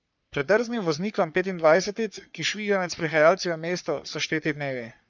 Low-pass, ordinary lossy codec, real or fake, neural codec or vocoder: 7.2 kHz; none; fake; codec, 44.1 kHz, 3.4 kbps, Pupu-Codec